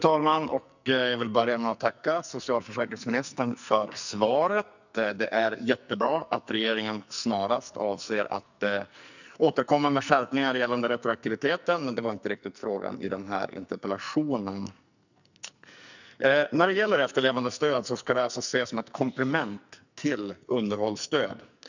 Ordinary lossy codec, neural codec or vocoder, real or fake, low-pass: none; codec, 44.1 kHz, 2.6 kbps, SNAC; fake; 7.2 kHz